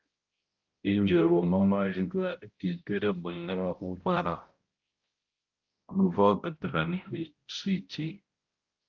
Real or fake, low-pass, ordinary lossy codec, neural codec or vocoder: fake; 7.2 kHz; Opus, 32 kbps; codec, 16 kHz, 0.5 kbps, X-Codec, HuBERT features, trained on balanced general audio